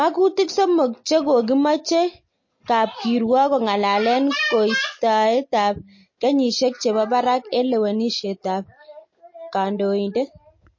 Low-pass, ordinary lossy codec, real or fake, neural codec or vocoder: 7.2 kHz; MP3, 32 kbps; real; none